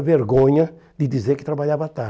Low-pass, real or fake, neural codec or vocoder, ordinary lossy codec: none; real; none; none